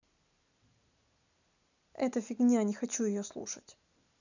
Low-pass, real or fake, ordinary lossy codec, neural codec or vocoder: 7.2 kHz; real; none; none